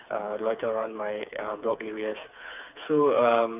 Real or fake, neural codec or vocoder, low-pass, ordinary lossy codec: fake; codec, 16 kHz, 4 kbps, FreqCodec, smaller model; 3.6 kHz; none